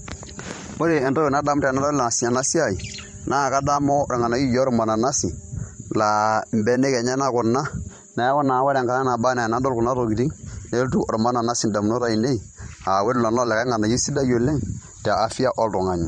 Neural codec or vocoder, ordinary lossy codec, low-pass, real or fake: vocoder, 44.1 kHz, 128 mel bands every 512 samples, BigVGAN v2; MP3, 48 kbps; 19.8 kHz; fake